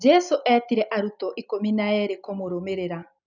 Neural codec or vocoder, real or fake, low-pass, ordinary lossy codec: none; real; 7.2 kHz; none